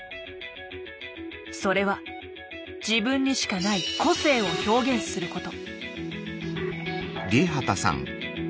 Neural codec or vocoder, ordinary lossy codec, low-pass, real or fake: none; none; none; real